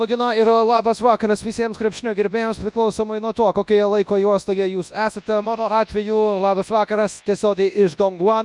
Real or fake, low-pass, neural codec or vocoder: fake; 10.8 kHz; codec, 24 kHz, 0.9 kbps, WavTokenizer, large speech release